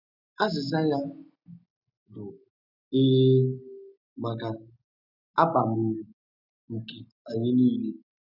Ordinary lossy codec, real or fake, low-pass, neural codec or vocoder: none; real; 5.4 kHz; none